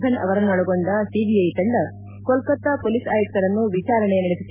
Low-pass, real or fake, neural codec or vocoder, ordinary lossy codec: 3.6 kHz; real; none; MP3, 32 kbps